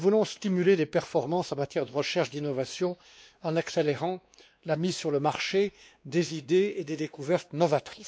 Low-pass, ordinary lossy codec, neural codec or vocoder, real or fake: none; none; codec, 16 kHz, 2 kbps, X-Codec, WavLM features, trained on Multilingual LibriSpeech; fake